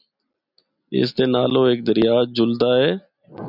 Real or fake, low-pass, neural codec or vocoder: real; 5.4 kHz; none